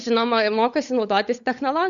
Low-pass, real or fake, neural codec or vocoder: 7.2 kHz; fake; codec, 16 kHz, 8 kbps, FunCodec, trained on Chinese and English, 25 frames a second